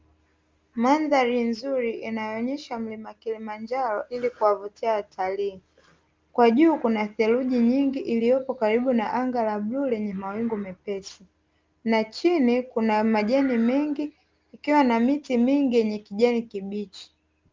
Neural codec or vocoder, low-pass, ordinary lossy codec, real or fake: none; 7.2 kHz; Opus, 32 kbps; real